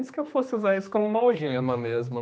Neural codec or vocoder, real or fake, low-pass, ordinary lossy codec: codec, 16 kHz, 2 kbps, X-Codec, HuBERT features, trained on general audio; fake; none; none